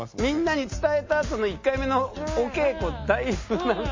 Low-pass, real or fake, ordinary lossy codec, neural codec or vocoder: 7.2 kHz; real; MP3, 32 kbps; none